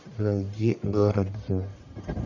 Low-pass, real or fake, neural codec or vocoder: 7.2 kHz; fake; codec, 44.1 kHz, 1.7 kbps, Pupu-Codec